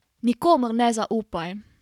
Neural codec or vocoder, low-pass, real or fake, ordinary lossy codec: codec, 44.1 kHz, 7.8 kbps, Pupu-Codec; 19.8 kHz; fake; none